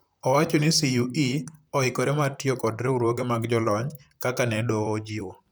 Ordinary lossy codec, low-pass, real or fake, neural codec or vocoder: none; none; fake; vocoder, 44.1 kHz, 128 mel bands, Pupu-Vocoder